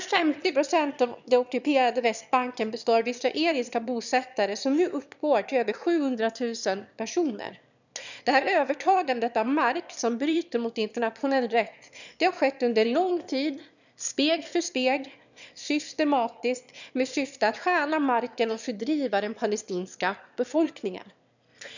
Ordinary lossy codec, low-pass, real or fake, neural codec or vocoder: none; 7.2 kHz; fake; autoencoder, 22.05 kHz, a latent of 192 numbers a frame, VITS, trained on one speaker